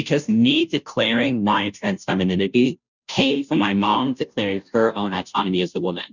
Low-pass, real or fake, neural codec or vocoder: 7.2 kHz; fake; codec, 16 kHz, 0.5 kbps, FunCodec, trained on Chinese and English, 25 frames a second